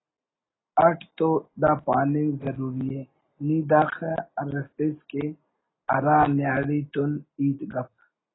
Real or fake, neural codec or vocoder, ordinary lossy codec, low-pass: real; none; AAC, 16 kbps; 7.2 kHz